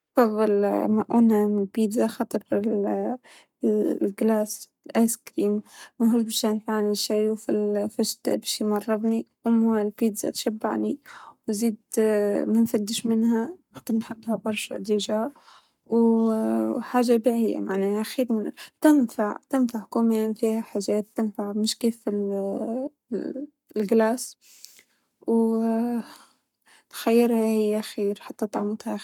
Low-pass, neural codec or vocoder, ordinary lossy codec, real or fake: 19.8 kHz; codec, 44.1 kHz, 7.8 kbps, Pupu-Codec; none; fake